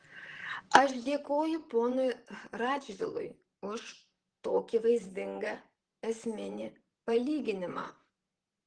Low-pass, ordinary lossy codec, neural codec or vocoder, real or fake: 9.9 kHz; Opus, 16 kbps; vocoder, 44.1 kHz, 128 mel bands, Pupu-Vocoder; fake